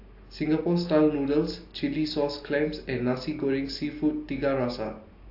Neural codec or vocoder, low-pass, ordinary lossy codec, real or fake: none; 5.4 kHz; AAC, 32 kbps; real